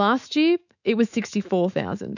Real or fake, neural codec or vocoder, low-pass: fake; autoencoder, 48 kHz, 128 numbers a frame, DAC-VAE, trained on Japanese speech; 7.2 kHz